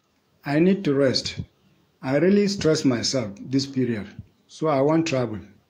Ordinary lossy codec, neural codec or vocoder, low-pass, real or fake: AAC, 48 kbps; autoencoder, 48 kHz, 128 numbers a frame, DAC-VAE, trained on Japanese speech; 19.8 kHz; fake